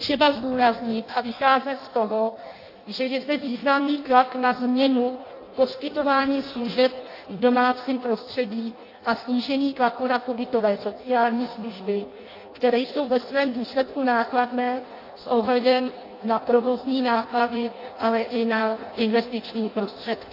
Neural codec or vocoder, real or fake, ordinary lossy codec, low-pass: codec, 16 kHz in and 24 kHz out, 0.6 kbps, FireRedTTS-2 codec; fake; AAC, 32 kbps; 5.4 kHz